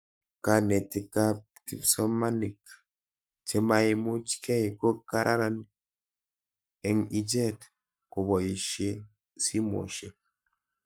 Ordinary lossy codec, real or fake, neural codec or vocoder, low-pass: none; fake; codec, 44.1 kHz, 7.8 kbps, Pupu-Codec; none